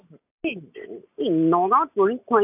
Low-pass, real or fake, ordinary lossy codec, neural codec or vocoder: 3.6 kHz; real; AAC, 32 kbps; none